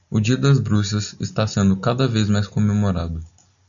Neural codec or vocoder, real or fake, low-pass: none; real; 7.2 kHz